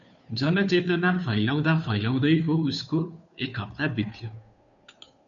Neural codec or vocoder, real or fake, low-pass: codec, 16 kHz, 2 kbps, FunCodec, trained on Chinese and English, 25 frames a second; fake; 7.2 kHz